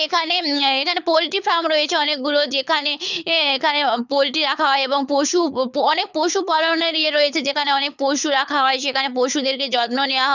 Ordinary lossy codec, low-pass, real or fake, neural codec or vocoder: none; 7.2 kHz; fake; codec, 24 kHz, 6 kbps, HILCodec